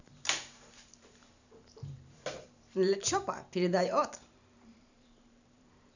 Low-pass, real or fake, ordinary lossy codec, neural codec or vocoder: 7.2 kHz; fake; none; vocoder, 44.1 kHz, 128 mel bands every 512 samples, BigVGAN v2